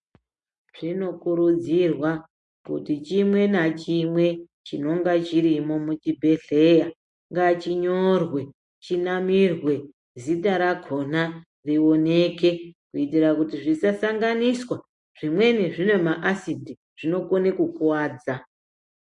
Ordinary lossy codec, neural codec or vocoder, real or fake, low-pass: MP3, 48 kbps; none; real; 10.8 kHz